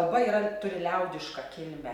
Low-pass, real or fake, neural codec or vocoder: 19.8 kHz; real; none